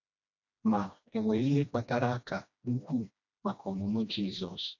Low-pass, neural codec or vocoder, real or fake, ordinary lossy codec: 7.2 kHz; codec, 16 kHz, 1 kbps, FreqCodec, smaller model; fake; none